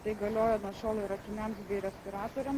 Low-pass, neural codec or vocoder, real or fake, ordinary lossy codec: 14.4 kHz; none; real; Opus, 16 kbps